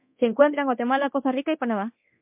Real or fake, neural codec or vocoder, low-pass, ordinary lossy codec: fake; codec, 24 kHz, 0.9 kbps, DualCodec; 3.6 kHz; MP3, 32 kbps